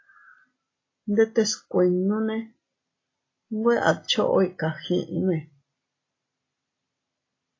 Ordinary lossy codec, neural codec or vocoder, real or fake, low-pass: AAC, 32 kbps; none; real; 7.2 kHz